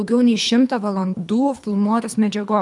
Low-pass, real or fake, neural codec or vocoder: 10.8 kHz; fake; codec, 24 kHz, 3 kbps, HILCodec